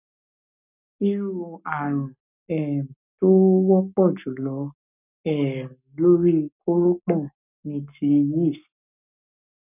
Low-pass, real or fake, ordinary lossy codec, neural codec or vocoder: 3.6 kHz; fake; none; codec, 44.1 kHz, 7.8 kbps, Pupu-Codec